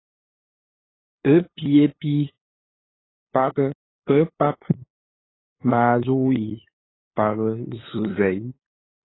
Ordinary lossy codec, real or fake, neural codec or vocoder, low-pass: AAC, 16 kbps; fake; codec, 16 kHz, 8 kbps, FunCodec, trained on LibriTTS, 25 frames a second; 7.2 kHz